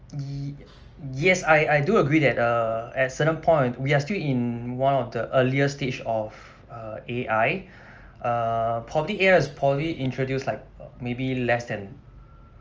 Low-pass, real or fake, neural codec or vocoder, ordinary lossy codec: 7.2 kHz; real; none; Opus, 24 kbps